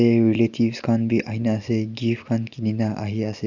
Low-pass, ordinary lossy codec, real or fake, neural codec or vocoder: 7.2 kHz; Opus, 64 kbps; real; none